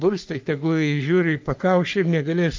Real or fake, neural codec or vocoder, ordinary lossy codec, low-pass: fake; codec, 44.1 kHz, 3.4 kbps, Pupu-Codec; Opus, 24 kbps; 7.2 kHz